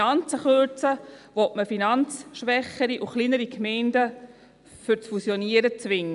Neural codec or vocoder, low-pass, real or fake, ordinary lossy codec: none; 10.8 kHz; real; none